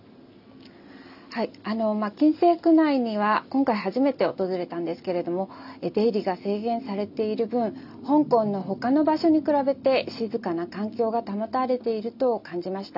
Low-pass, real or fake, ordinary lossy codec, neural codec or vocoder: 5.4 kHz; real; none; none